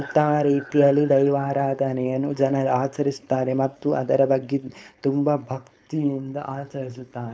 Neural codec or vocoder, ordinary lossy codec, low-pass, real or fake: codec, 16 kHz, 4.8 kbps, FACodec; none; none; fake